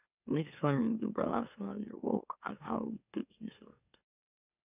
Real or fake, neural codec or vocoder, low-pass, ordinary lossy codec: fake; autoencoder, 44.1 kHz, a latent of 192 numbers a frame, MeloTTS; 3.6 kHz; none